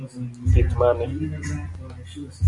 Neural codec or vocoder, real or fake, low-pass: none; real; 10.8 kHz